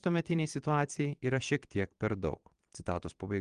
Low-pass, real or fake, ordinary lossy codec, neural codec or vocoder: 9.9 kHz; fake; Opus, 24 kbps; vocoder, 22.05 kHz, 80 mel bands, Vocos